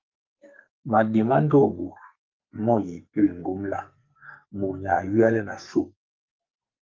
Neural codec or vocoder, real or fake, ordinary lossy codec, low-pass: codec, 44.1 kHz, 2.6 kbps, SNAC; fake; Opus, 24 kbps; 7.2 kHz